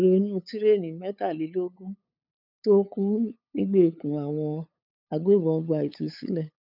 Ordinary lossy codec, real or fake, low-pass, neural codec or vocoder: none; fake; 5.4 kHz; codec, 16 kHz, 8 kbps, FunCodec, trained on LibriTTS, 25 frames a second